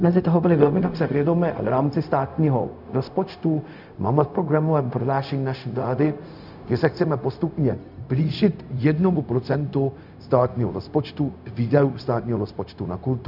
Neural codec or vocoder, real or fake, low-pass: codec, 16 kHz, 0.4 kbps, LongCat-Audio-Codec; fake; 5.4 kHz